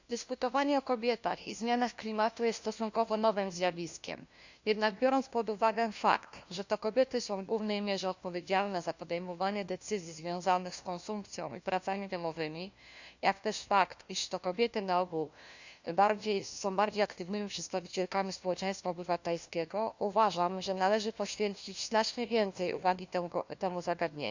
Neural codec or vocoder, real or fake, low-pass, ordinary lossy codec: codec, 16 kHz, 1 kbps, FunCodec, trained on LibriTTS, 50 frames a second; fake; 7.2 kHz; Opus, 64 kbps